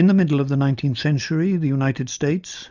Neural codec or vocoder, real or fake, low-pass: none; real; 7.2 kHz